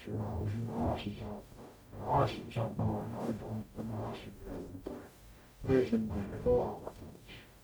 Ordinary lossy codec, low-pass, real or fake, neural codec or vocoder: none; none; fake; codec, 44.1 kHz, 0.9 kbps, DAC